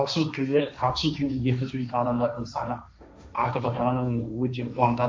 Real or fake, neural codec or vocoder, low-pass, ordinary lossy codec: fake; codec, 16 kHz, 1.1 kbps, Voila-Tokenizer; none; none